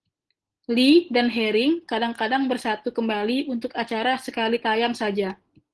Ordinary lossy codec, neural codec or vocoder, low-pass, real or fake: Opus, 16 kbps; none; 10.8 kHz; real